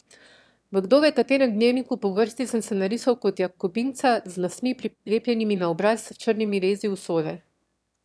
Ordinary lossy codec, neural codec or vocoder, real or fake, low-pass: none; autoencoder, 22.05 kHz, a latent of 192 numbers a frame, VITS, trained on one speaker; fake; none